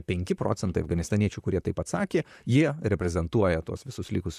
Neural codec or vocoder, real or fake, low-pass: vocoder, 44.1 kHz, 128 mel bands, Pupu-Vocoder; fake; 14.4 kHz